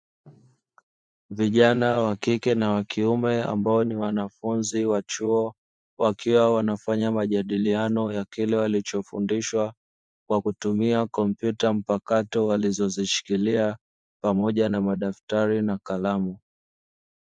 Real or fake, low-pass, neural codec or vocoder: fake; 9.9 kHz; vocoder, 24 kHz, 100 mel bands, Vocos